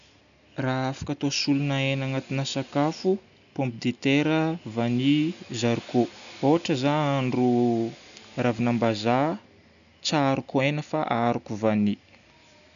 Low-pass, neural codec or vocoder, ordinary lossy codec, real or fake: 7.2 kHz; none; none; real